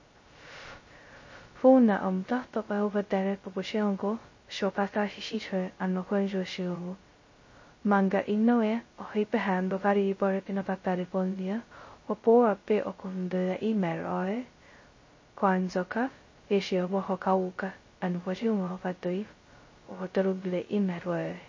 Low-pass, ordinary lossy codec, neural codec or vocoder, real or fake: 7.2 kHz; MP3, 32 kbps; codec, 16 kHz, 0.2 kbps, FocalCodec; fake